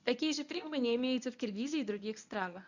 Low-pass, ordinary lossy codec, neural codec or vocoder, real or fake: 7.2 kHz; none; codec, 24 kHz, 0.9 kbps, WavTokenizer, medium speech release version 1; fake